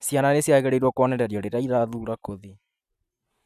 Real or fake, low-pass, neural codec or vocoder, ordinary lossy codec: real; 14.4 kHz; none; none